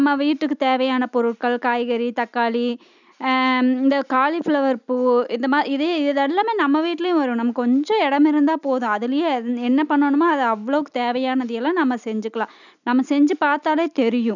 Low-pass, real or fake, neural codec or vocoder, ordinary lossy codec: 7.2 kHz; real; none; none